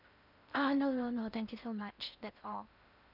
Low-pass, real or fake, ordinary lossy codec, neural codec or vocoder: 5.4 kHz; fake; none; codec, 16 kHz in and 24 kHz out, 0.6 kbps, FocalCodec, streaming, 4096 codes